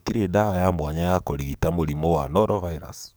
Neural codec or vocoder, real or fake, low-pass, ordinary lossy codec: codec, 44.1 kHz, 7.8 kbps, DAC; fake; none; none